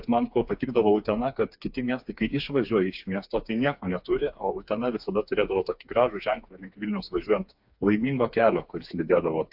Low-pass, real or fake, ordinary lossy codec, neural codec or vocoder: 5.4 kHz; fake; AAC, 48 kbps; codec, 16 kHz, 4 kbps, FreqCodec, smaller model